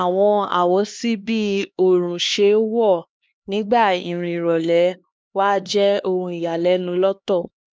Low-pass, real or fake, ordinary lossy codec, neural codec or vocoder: none; fake; none; codec, 16 kHz, 2 kbps, X-Codec, HuBERT features, trained on LibriSpeech